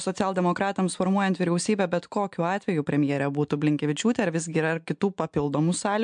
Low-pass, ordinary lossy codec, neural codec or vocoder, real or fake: 9.9 kHz; MP3, 64 kbps; none; real